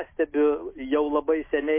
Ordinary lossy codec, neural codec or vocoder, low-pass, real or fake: MP3, 24 kbps; none; 3.6 kHz; real